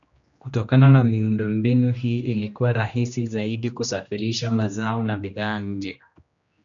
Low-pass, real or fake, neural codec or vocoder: 7.2 kHz; fake; codec, 16 kHz, 1 kbps, X-Codec, HuBERT features, trained on general audio